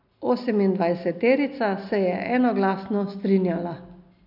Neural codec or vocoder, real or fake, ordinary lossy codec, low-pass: none; real; none; 5.4 kHz